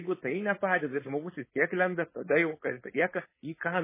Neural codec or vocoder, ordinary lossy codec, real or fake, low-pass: codec, 16 kHz, 4.8 kbps, FACodec; MP3, 16 kbps; fake; 3.6 kHz